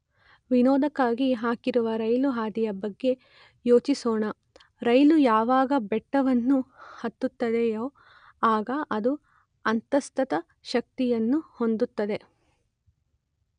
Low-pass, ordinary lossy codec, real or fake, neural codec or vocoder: 9.9 kHz; none; real; none